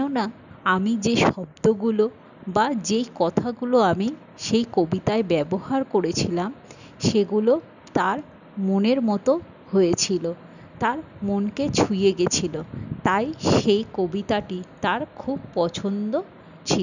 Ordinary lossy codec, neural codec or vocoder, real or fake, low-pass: none; none; real; 7.2 kHz